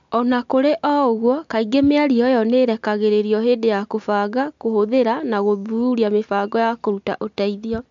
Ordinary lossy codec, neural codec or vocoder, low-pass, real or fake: MP3, 64 kbps; none; 7.2 kHz; real